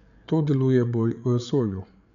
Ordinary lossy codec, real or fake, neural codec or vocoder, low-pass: none; fake; codec, 16 kHz, 8 kbps, FreqCodec, larger model; 7.2 kHz